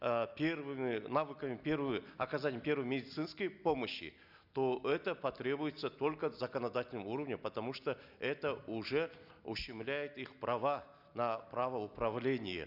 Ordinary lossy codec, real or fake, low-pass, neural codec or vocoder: none; real; 5.4 kHz; none